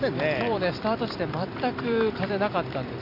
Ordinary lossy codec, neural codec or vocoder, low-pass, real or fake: none; vocoder, 44.1 kHz, 128 mel bands every 256 samples, BigVGAN v2; 5.4 kHz; fake